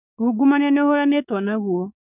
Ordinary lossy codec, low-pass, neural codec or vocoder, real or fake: none; 3.6 kHz; codec, 16 kHz, 6 kbps, DAC; fake